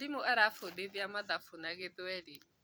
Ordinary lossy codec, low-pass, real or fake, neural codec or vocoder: none; none; fake; vocoder, 44.1 kHz, 128 mel bands every 256 samples, BigVGAN v2